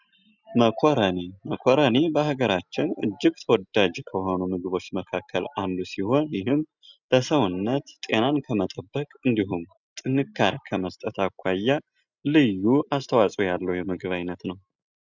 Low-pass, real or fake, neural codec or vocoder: 7.2 kHz; real; none